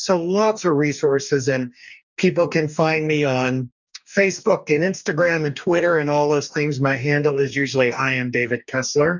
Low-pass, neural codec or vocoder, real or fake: 7.2 kHz; codec, 44.1 kHz, 2.6 kbps, DAC; fake